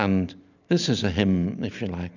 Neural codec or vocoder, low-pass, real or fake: none; 7.2 kHz; real